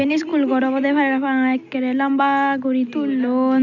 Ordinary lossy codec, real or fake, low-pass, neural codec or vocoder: none; real; 7.2 kHz; none